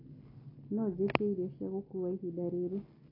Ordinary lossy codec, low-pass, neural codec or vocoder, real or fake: AAC, 24 kbps; 5.4 kHz; none; real